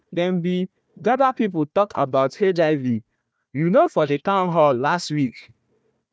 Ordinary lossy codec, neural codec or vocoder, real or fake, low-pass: none; codec, 16 kHz, 1 kbps, FunCodec, trained on Chinese and English, 50 frames a second; fake; none